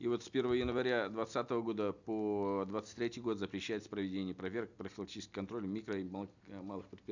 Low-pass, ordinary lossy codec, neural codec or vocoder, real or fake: 7.2 kHz; none; none; real